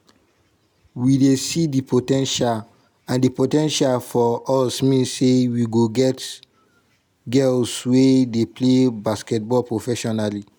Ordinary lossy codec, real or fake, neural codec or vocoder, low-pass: none; real; none; none